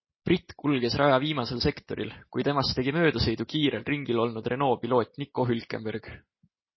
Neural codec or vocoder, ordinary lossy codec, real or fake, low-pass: none; MP3, 24 kbps; real; 7.2 kHz